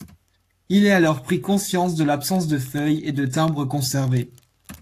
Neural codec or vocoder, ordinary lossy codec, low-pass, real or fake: codec, 44.1 kHz, 7.8 kbps, Pupu-Codec; AAC, 64 kbps; 14.4 kHz; fake